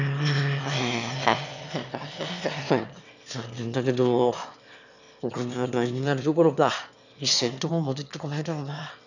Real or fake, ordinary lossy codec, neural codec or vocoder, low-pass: fake; none; autoencoder, 22.05 kHz, a latent of 192 numbers a frame, VITS, trained on one speaker; 7.2 kHz